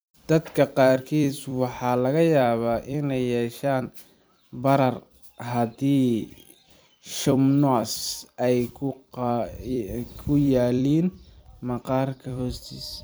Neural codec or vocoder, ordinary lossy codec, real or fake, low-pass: vocoder, 44.1 kHz, 128 mel bands every 256 samples, BigVGAN v2; none; fake; none